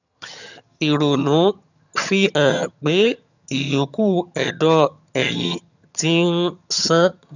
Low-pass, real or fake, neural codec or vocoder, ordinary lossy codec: 7.2 kHz; fake; vocoder, 22.05 kHz, 80 mel bands, HiFi-GAN; none